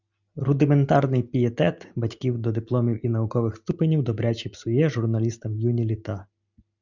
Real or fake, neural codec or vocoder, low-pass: real; none; 7.2 kHz